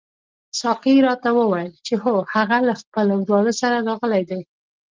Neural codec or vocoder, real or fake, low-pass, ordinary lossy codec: none; real; 7.2 kHz; Opus, 32 kbps